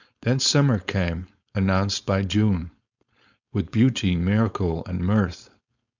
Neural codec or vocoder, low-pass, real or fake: codec, 16 kHz, 4.8 kbps, FACodec; 7.2 kHz; fake